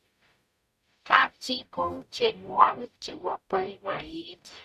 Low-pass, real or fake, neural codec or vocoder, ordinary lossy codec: 14.4 kHz; fake; codec, 44.1 kHz, 0.9 kbps, DAC; none